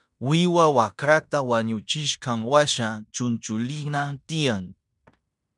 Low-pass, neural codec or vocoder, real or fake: 10.8 kHz; codec, 16 kHz in and 24 kHz out, 0.9 kbps, LongCat-Audio-Codec, fine tuned four codebook decoder; fake